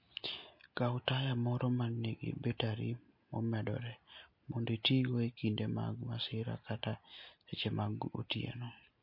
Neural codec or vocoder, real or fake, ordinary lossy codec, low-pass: none; real; MP3, 32 kbps; 5.4 kHz